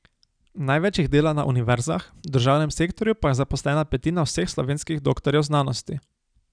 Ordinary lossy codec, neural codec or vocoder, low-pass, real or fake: none; none; 9.9 kHz; real